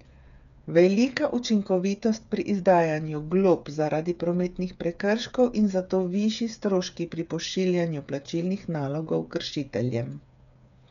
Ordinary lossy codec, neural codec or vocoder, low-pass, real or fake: none; codec, 16 kHz, 8 kbps, FreqCodec, smaller model; 7.2 kHz; fake